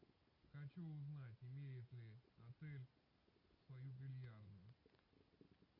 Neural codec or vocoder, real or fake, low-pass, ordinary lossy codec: none; real; 5.4 kHz; MP3, 32 kbps